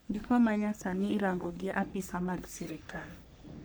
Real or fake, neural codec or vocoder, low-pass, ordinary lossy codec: fake; codec, 44.1 kHz, 3.4 kbps, Pupu-Codec; none; none